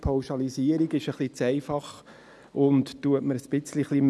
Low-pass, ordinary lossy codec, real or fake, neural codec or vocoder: none; none; real; none